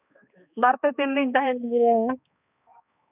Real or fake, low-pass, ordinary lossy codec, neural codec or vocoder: fake; 3.6 kHz; none; codec, 16 kHz, 2 kbps, X-Codec, HuBERT features, trained on balanced general audio